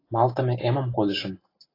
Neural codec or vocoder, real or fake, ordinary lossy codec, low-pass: none; real; AAC, 24 kbps; 5.4 kHz